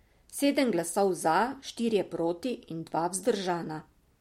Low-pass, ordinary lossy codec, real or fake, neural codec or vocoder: 19.8 kHz; MP3, 64 kbps; real; none